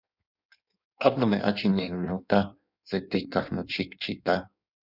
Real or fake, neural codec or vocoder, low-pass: fake; codec, 16 kHz in and 24 kHz out, 1.1 kbps, FireRedTTS-2 codec; 5.4 kHz